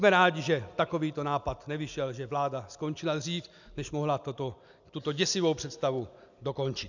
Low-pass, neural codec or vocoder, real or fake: 7.2 kHz; none; real